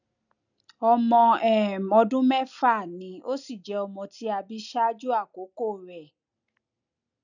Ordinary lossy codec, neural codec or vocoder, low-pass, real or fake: none; none; 7.2 kHz; real